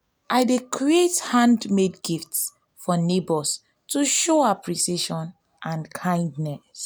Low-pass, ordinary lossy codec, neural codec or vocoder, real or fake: none; none; none; real